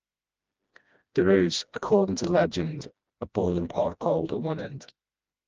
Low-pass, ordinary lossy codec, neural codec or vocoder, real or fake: 7.2 kHz; Opus, 24 kbps; codec, 16 kHz, 1 kbps, FreqCodec, smaller model; fake